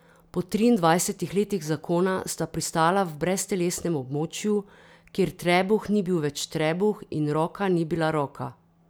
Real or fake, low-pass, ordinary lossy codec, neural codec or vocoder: real; none; none; none